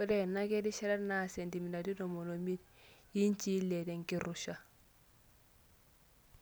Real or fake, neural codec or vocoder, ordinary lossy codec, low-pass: real; none; none; none